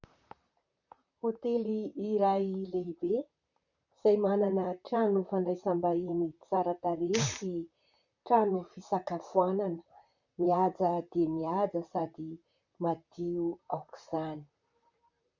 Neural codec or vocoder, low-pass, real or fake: vocoder, 44.1 kHz, 128 mel bands, Pupu-Vocoder; 7.2 kHz; fake